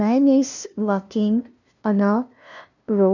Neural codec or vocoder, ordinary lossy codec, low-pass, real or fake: codec, 16 kHz, 0.5 kbps, FunCodec, trained on LibriTTS, 25 frames a second; none; 7.2 kHz; fake